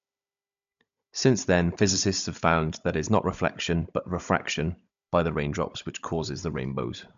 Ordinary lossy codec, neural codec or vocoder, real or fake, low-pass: MP3, 64 kbps; codec, 16 kHz, 16 kbps, FunCodec, trained on Chinese and English, 50 frames a second; fake; 7.2 kHz